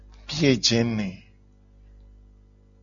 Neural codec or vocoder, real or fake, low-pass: none; real; 7.2 kHz